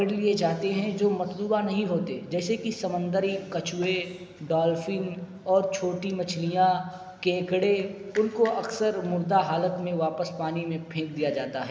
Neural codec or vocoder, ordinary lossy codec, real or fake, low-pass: none; none; real; none